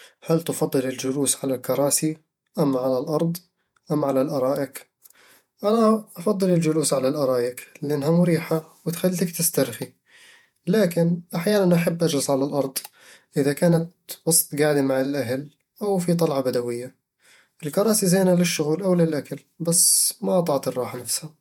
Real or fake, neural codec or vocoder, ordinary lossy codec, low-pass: fake; vocoder, 48 kHz, 128 mel bands, Vocos; MP3, 96 kbps; 19.8 kHz